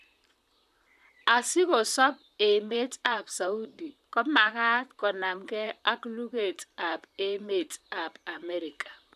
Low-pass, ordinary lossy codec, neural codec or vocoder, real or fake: 14.4 kHz; none; vocoder, 44.1 kHz, 128 mel bands, Pupu-Vocoder; fake